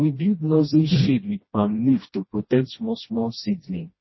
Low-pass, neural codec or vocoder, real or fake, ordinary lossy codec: 7.2 kHz; codec, 16 kHz, 1 kbps, FreqCodec, smaller model; fake; MP3, 24 kbps